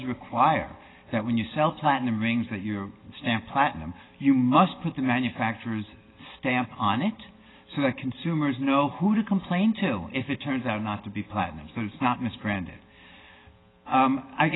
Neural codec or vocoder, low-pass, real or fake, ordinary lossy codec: none; 7.2 kHz; real; AAC, 16 kbps